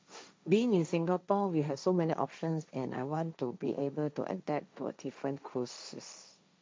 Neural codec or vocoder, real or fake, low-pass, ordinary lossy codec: codec, 16 kHz, 1.1 kbps, Voila-Tokenizer; fake; none; none